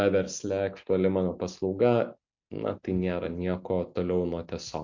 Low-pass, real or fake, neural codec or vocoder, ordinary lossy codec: 7.2 kHz; real; none; MP3, 48 kbps